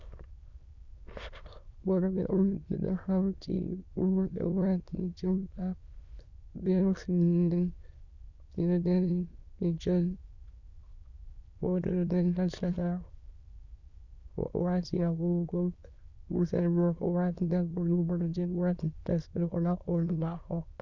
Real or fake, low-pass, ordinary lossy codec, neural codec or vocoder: fake; 7.2 kHz; Opus, 64 kbps; autoencoder, 22.05 kHz, a latent of 192 numbers a frame, VITS, trained on many speakers